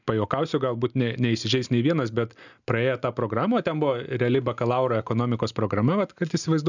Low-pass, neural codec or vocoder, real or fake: 7.2 kHz; none; real